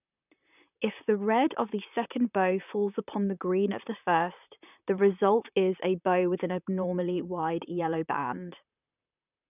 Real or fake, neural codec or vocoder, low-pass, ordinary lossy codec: fake; vocoder, 44.1 kHz, 128 mel bands, Pupu-Vocoder; 3.6 kHz; none